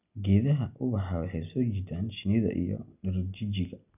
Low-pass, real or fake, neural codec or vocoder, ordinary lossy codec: 3.6 kHz; real; none; none